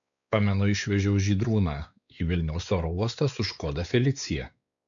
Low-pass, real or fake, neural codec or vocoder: 7.2 kHz; fake; codec, 16 kHz, 4 kbps, X-Codec, WavLM features, trained on Multilingual LibriSpeech